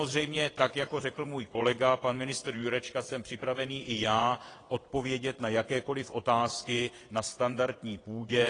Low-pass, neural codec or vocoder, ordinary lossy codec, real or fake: 9.9 kHz; vocoder, 22.05 kHz, 80 mel bands, WaveNeXt; AAC, 32 kbps; fake